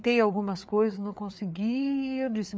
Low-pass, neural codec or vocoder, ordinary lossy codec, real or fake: none; codec, 16 kHz, 4 kbps, FreqCodec, larger model; none; fake